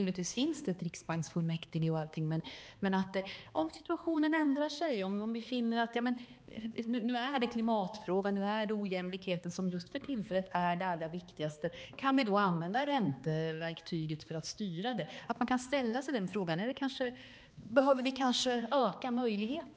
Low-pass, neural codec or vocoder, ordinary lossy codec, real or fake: none; codec, 16 kHz, 2 kbps, X-Codec, HuBERT features, trained on balanced general audio; none; fake